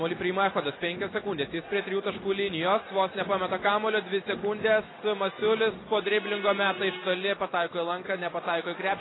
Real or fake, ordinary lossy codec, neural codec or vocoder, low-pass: real; AAC, 16 kbps; none; 7.2 kHz